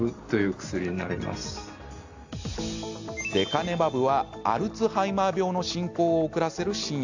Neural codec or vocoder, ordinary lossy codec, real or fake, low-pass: none; AAC, 48 kbps; real; 7.2 kHz